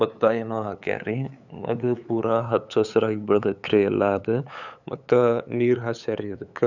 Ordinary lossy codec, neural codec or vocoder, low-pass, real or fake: none; codec, 16 kHz, 8 kbps, FunCodec, trained on LibriTTS, 25 frames a second; 7.2 kHz; fake